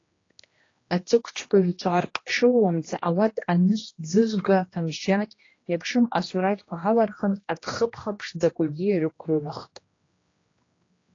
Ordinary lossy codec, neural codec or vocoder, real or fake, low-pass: AAC, 32 kbps; codec, 16 kHz, 1 kbps, X-Codec, HuBERT features, trained on general audio; fake; 7.2 kHz